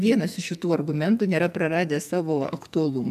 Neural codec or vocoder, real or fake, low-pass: codec, 44.1 kHz, 2.6 kbps, SNAC; fake; 14.4 kHz